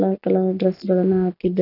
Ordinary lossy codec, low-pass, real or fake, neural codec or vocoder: none; 5.4 kHz; real; none